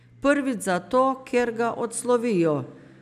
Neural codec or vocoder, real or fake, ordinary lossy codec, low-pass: none; real; none; 14.4 kHz